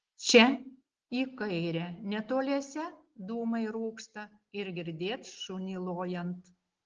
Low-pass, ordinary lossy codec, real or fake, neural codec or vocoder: 7.2 kHz; Opus, 16 kbps; real; none